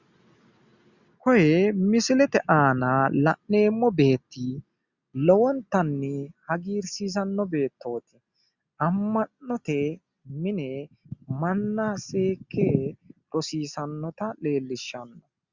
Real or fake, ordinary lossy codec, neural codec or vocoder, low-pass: real; Opus, 64 kbps; none; 7.2 kHz